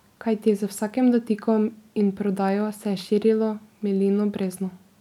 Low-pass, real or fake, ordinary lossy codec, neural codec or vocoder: 19.8 kHz; real; none; none